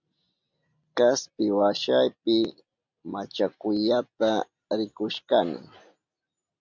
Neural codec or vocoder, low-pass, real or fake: none; 7.2 kHz; real